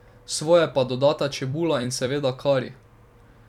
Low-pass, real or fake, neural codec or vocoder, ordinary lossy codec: 19.8 kHz; fake; vocoder, 44.1 kHz, 128 mel bands every 256 samples, BigVGAN v2; none